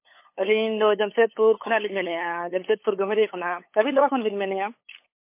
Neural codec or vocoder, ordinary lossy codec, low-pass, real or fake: codec, 16 kHz, 8 kbps, FunCodec, trained on LibriTTS, 25 frames a second; MP3, 24 kbps; 3.6 kHz; fake